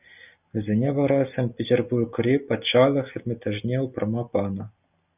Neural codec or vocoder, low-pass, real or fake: none; 3.6 kHz; real